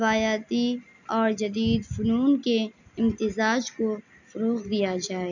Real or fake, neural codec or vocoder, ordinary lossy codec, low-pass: real; none; none; 7.2 kHz